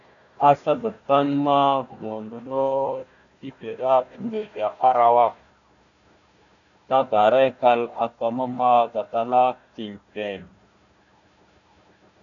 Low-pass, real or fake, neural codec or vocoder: 7.2 kHz; fake; codec, 16 kHz, 1 kbps, FunCodec, trained on Chinese and English, 50 frames a second